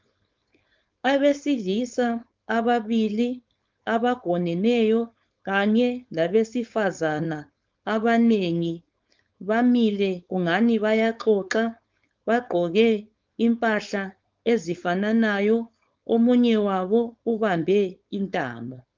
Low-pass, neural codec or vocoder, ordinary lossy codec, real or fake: 7.2 kHz; codec, 16 kHz, 4.8 kbps, FACodec; Opus, 24 kbps; fake